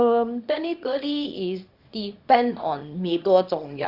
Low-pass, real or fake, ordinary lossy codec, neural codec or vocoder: 5.4 kHz; fake; Opus, 64 kbps; codec, 24 kHz, 0.9 kbps, WavTokenizer, small release